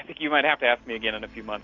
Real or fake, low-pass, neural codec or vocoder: real; 7.2 kHz; none